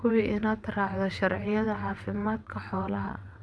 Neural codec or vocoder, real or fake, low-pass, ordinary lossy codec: vocoder, 22.05 kHz, 80 mel bands, WaveNeXt; fake; none; none